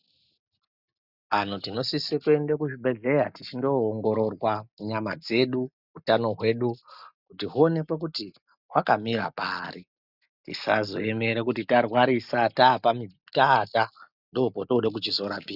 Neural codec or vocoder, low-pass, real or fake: none; 5.4 kHz; real